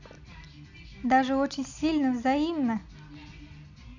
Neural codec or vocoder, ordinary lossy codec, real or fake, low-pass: none; none; real; 7.2 kHz